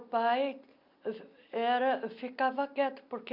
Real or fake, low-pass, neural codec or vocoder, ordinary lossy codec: real; 5.4 kHz; none; MP3, 48 kbps